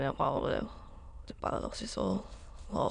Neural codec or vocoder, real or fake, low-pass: autoencoder, 22.05 kHz, a latent of 192 numbers a frame, VITS, trained on many speakers; fake; 9.9 kHz